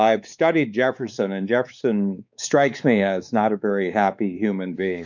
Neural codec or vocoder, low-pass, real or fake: codec, 16 kHz, 4 kbps, X-Codec, WavLM features, trained on Multilingual LibriSpeech; 7.2 kHz; fake